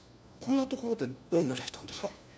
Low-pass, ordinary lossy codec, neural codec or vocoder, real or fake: none; none; codec, 16 kHz, 1 kbps, FunCodec, trained on LibriTTS, 50 frames a second; fake